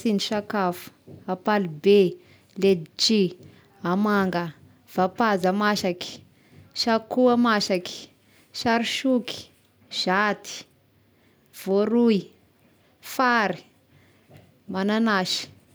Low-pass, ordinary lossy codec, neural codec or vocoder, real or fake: none; none; none; real